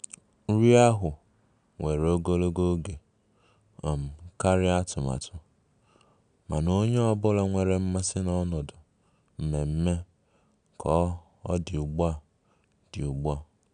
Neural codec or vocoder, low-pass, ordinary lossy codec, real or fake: none; 9.9 kHz; none; real